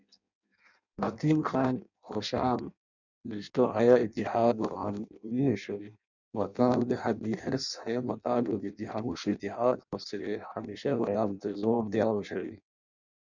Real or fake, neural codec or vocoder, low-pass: fake; codec, 16 kHz in and 24 kHz out, 0.6 kbps, FireRedTTS-2 codec; 7.2 kHz